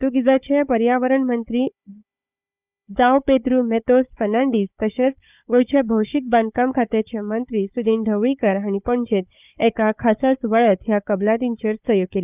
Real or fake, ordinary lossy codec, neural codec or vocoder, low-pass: fake; none; codec, 16 kHz, 4 kbps, FunCodec, trained on Chinese and English, 50 frames a second; 3.6 kHz